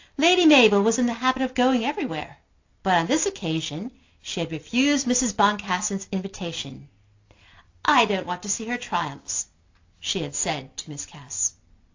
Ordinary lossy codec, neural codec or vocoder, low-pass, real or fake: AAC, 48 kbps; none; 7.2 kHz; real